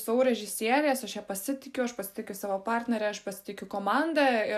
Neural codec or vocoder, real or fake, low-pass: none; real; 14.4 kHz